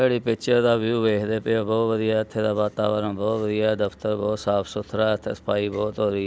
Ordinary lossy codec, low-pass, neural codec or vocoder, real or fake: none; none; none; real